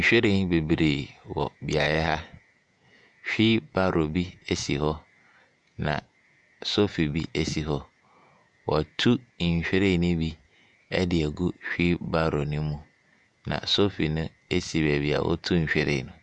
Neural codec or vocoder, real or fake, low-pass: vocoder, 48 kHz, 128 mel bands, Vocos; fake; 10.8 kHz